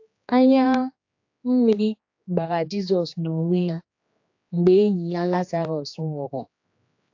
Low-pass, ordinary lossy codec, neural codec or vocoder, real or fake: 7.2 kHz; AAC, 48 kbps; codec, 16 kHz, 2 kbps, X-Codec, HuBERT features, trained on general audio; fake